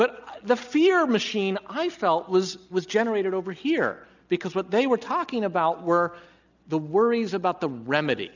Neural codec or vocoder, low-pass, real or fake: none; 7.2 kHz; real